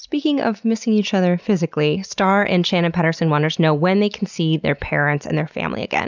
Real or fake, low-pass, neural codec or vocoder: real; 7.2 kHz; none